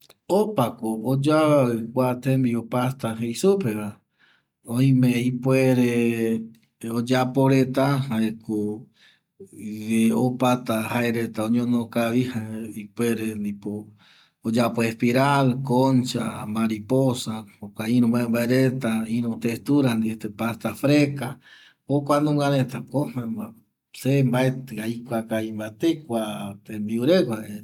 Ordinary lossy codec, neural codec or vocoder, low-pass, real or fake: none; none; 19.8 kHz; real